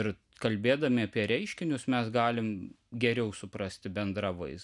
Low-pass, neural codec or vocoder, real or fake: 10.8 kHz; none; real